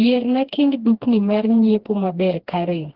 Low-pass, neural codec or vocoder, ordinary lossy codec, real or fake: 5.4 kHz; codec, 16 kHz, 2 kbps, FreqCodec, smaller model; Opus, 16 kbps; fake